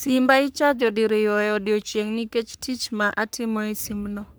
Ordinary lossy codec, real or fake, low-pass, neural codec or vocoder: none; fake; none; codec, 44.1 kHz, 3.4 kbps, Pupu-Codec